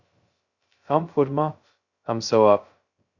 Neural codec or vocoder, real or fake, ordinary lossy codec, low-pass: codec, 16 kHz, 0.2 kbps, FocalCodec; fake; Opus, 64 kbps; 7.2 kHz